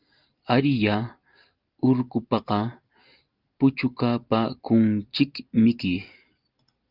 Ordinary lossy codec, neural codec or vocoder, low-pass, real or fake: Opus, 32 kbps; none; 5.4 kHz; real